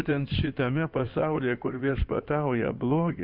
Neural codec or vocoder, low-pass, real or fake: codec, 16 kHz in and 24 kHz out, 2.2 kbps, FireRedTTS-2 codec; 5.4 kHz; fake